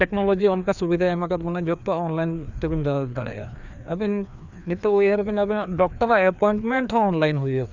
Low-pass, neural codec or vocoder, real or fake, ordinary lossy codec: 7.2 kHz; codec, 16 kHz, 2 kbps, FreqCodec, larger model; fake; none